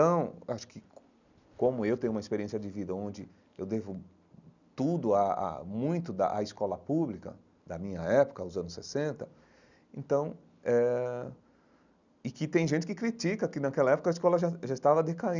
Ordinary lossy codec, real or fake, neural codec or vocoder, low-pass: none; real; none; 7.2 kHz